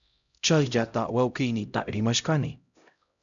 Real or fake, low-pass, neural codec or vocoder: fake; 7.2 kHz; codec, 16 kHz, 0.5 kbps, X-Codec, HuBERT features, trained on LibriSpeech